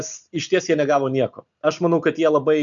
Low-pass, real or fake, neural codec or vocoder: 7.2 kHz; real; none